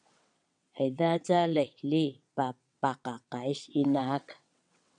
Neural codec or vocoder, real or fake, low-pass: vocoder, 22.05 kHz, 80 mel bands, WaveNeXt; fake; 9.9 kHz